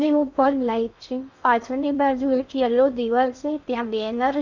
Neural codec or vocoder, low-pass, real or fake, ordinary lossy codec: codec, 16 kHz in and 24 kHz out, 0.6 kbps, FocalCodec, streaming, 2048 codes; 7.2 kHz; fake; none